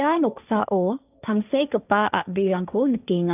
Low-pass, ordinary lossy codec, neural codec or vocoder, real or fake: 3.6 kHz; none; codec, 16 kHz, 1.1 kbps, Voila-Tokenizer; fake